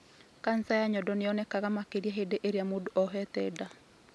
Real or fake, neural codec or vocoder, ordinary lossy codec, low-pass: real; none; none; none